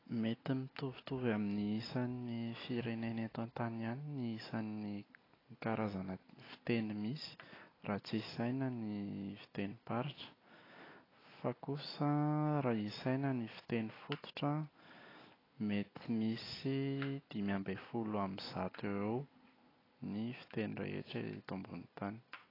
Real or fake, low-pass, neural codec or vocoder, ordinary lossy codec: real; 5.4 kHz; none; AAC, 24 kbps